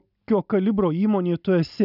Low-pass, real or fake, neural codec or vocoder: 5.4 kHz; real; none